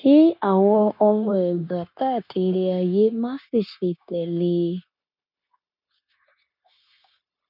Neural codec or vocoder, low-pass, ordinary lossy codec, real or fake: codec, 16 kHz, 0.9 kbps, LongCat-Audio-Codec; 5.4 kHz; none; fake